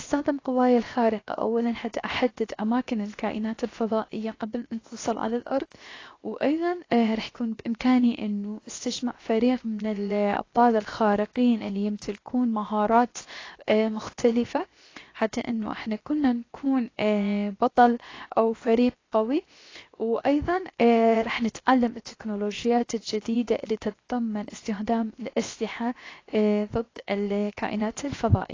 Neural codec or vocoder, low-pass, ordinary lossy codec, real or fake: codec, 16 kHz, 0.7 kbps, FocalCodec; 7.2 kHz; AAC, 32 kbps; fake